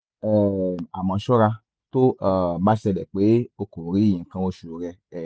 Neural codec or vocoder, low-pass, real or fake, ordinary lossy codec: none; none; real; none